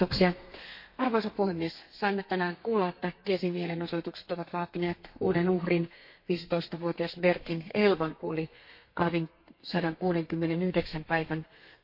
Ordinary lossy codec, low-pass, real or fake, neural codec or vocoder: MP3, 32 kbps; 5.4 kHz; fake; codec, 32 kHz, 1.9 kbps, SNAC